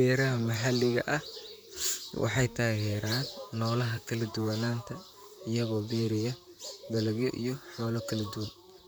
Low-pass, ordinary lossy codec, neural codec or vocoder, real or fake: none; none; vocoder, 44.1 kHz, 128 mel bands, Pupu-Vocoder; fake